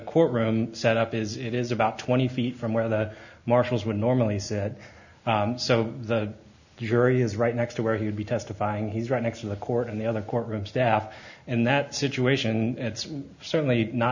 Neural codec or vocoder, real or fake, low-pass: none; real; 7.2 kHz